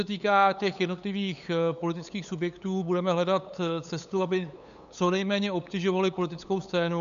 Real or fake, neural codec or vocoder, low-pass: fake; codec, 16 kHz, 8 kbps, FunCodec, trained on LibriTTS, 25 frames a second; 7.2 kHz